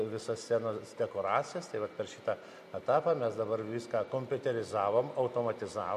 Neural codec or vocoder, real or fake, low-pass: none; real; 14.4 kHz